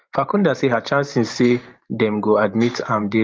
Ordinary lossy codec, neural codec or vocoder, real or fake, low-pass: Opus, 32 kbps; vocoder, 44.1 kHz, 128 mel bands every 512 samples, BigVGAN v2; fake; 7.2 kHz